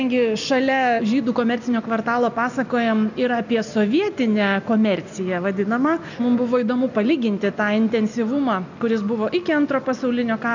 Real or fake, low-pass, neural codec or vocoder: real; 7.2 kHz; none